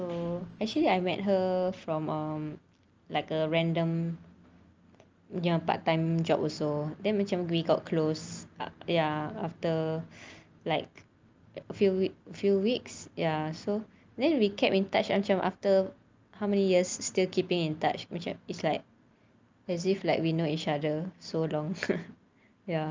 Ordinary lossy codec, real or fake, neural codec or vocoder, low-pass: Opus, 24 kbps; real; none; 7.2 kHz